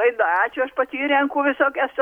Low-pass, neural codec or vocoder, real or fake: 19.8 kHz; none; real